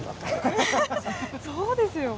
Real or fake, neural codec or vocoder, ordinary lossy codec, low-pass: real; none; none; none